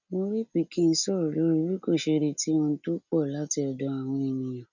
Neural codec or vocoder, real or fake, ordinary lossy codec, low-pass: none; real; none; 7.2 kHz